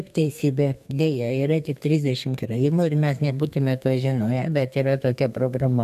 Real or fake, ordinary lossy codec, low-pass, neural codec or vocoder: fake; MP3, 96 kbps; 14.4 kHz; codec, 32 kHz, 1.9 kbps, SNAC